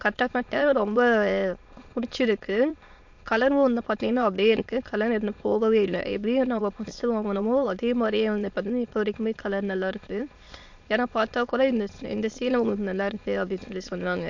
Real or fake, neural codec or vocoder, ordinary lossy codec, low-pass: fake; autoencoder, 22.05 kHz, a latent of 192 numbers a frame, VITS, trained on many speakers; MP3, 48 kbps; 7.2 kHz